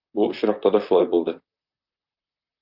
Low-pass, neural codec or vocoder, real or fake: 5.4 kHz; vocoder, 44.1 kHz, 128 mel bands, Pupu-Vocoder; fake